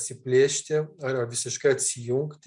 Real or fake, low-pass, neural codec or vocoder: real; 10.8 kHz; none